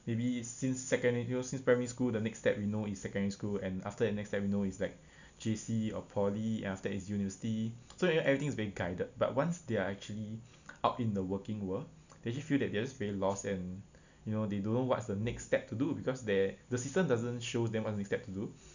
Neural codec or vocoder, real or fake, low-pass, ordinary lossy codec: none; real; 7.2 kHz; none